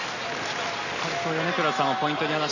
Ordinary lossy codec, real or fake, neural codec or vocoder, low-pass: none; real; none; 7.2 kHz